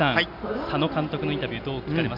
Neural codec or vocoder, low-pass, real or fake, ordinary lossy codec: none; 5.4 kHz; real; AAC, 48 kbps